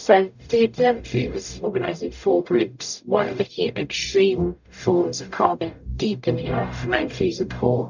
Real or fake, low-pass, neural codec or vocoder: fake; 7.2 kHz; codec, 44.1 kHz, 0.9 kbps, DAC